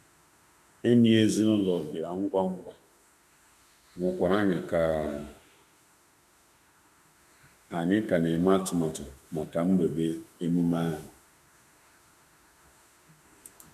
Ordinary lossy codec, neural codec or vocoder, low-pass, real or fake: none; autoencoder, 48 kHz, 32 numbers a frame, DAC-VAE, trained on Japanese speech; 14.4 kHz; fake